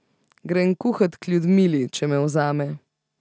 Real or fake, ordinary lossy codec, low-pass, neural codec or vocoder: real; none; none; none